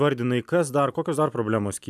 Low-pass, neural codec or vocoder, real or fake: 14.4 kHz; none; real